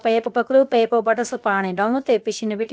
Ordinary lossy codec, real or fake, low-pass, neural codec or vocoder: none; fake; none; codec, 16 kHz, 0.7 kbps, FocalCodec